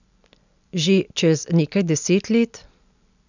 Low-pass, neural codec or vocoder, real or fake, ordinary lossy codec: 7.2 kHz; none; real; none